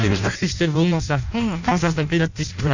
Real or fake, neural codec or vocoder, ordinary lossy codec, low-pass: fake; codec, 16 kHz in and 24 kHz out, 0.6 kbps, FireRedTTS-2 codec; none; 7.2 kHz